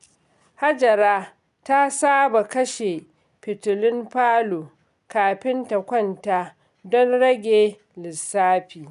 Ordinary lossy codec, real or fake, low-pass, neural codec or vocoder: MP3, 96 kbps; real; 10.8 kHz; none